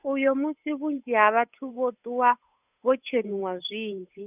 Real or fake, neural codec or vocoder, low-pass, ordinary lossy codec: fake; codec, 16 kHz, 8 kbps, FunCodec, trained on Chinese and English, 25 frames a second; 3.6 kHz; none